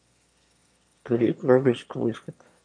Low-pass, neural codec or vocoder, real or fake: 9.9 kHz; autoencoder, 22.05 kHz, a latent of 192 numbers a frame, VITS, trained on one speaker; fake